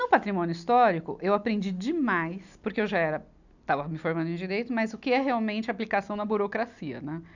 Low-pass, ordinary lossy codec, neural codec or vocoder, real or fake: 7.2 kHz; none; none; real